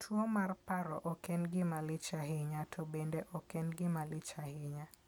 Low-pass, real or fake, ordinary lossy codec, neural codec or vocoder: none; real; none; none